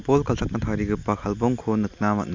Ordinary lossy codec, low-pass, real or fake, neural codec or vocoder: MP3, 64 kbps; 7.2 kHz; real; none